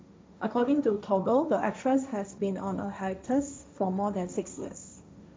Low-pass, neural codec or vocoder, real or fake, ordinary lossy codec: none; codec, 16 kHz, 1.1 kbps, Voila-Tokenizer; fake; none